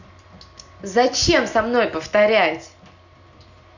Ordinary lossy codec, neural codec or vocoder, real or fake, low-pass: none; none; real; 7.2 kHz